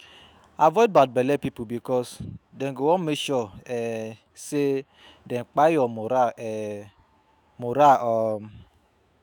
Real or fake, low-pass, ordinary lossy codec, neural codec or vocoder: fake; none; none; autoencoder, 48 kHz, 128 numbers a frame, DAC-VAE, trained on Japanese speech